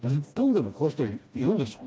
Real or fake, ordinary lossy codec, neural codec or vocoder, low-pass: fake; none; codec, 16 kHz, 1 kbps, FreqCodec, smaller model; none